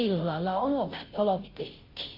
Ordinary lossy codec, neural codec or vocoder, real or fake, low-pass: Opus, 24 kbps; codec, 16 kHz, 0.5 kbps, FunCodec, trained on Chinese and English, 25 frames a second; fake; 5.4 kHz